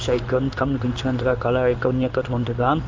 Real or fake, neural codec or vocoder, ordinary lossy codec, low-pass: fake; codec, 16 kHz in and 24 kHz out, 1 kbps, XY-Tokenizer; Opus, 32 kbps; 7.2 kHz